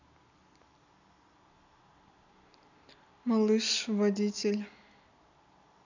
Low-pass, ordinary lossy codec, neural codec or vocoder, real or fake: 7.2 kHz; none; none; real